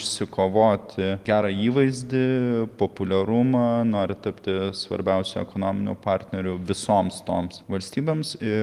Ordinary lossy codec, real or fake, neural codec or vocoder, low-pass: Opus, 24 kbps; real; none; 14.4 kHz